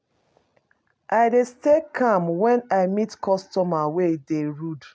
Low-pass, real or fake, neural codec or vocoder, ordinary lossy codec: none; real; none; none